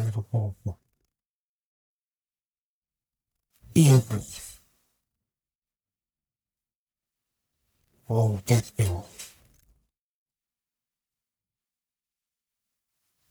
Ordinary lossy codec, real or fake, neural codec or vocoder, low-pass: none; fake; codec, 44.1 kHz, 1.7 kbps, Pupu-Codec; none